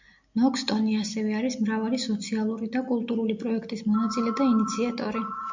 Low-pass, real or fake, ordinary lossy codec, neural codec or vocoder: 7.2 kHz; real; MP3, 64 kbps; none